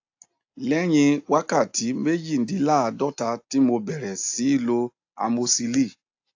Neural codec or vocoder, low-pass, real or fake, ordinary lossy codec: none; 7.2 kHz; real; AAC, 48 kbps